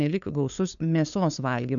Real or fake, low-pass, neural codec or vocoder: fake; 7.2 kHz; codec, 16 kHz, 2 kbps, FunCodec, trained on LibriTTS, 25 frames a second